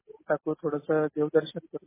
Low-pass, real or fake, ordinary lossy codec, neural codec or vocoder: 3.6 kHz; real; MP3, 16 kbps; none